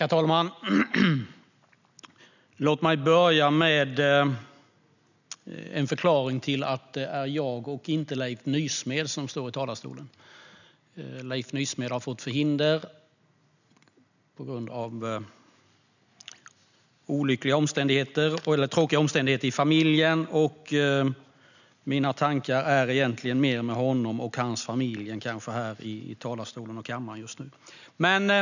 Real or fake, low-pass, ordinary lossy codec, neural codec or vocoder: real; 7.2 kHz; none; none